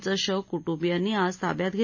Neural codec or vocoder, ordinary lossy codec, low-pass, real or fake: none; MP3, 32 kbps; 7.2 kHz; real